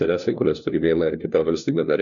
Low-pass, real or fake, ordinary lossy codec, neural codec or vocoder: 7.2 kHz; fake; MP3, 96 kbps; codec, 16 kHz, 1 kbps, FunCodec, trained on LibriTTS, 50 frames a second